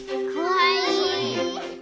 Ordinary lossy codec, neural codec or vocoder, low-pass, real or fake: none; none; none; real